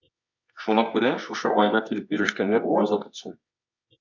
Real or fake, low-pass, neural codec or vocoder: fake; 7.2 kHz; codec, 24 kHz, 0.9 kbps, WavTokenizer, medium music audio release